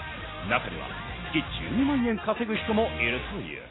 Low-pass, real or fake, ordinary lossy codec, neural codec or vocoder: 7.2 kHz; real; AAC, 16 kbps; none